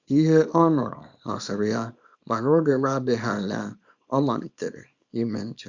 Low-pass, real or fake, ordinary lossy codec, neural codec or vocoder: 7.2 kHz; fake; Opus, 64 kbps; codec, 24 kHz, 0.9 kbps, WavTokenizer, small release